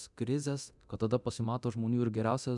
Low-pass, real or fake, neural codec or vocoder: 10.8 kHz; fake; codec, 24 kHz, 0.9 kbps, DualCodec